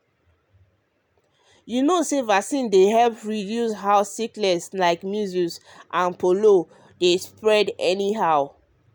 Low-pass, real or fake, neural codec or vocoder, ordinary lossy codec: none; real; none; none